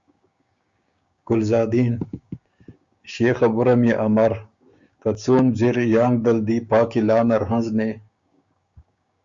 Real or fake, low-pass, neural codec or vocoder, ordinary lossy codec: fake; 7.2 kHz; codec, 16 kHz, 6 kbps, DAC; Opus, 64 kbps